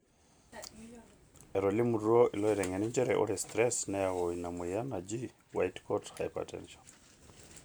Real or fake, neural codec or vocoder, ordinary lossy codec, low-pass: real; none; none; none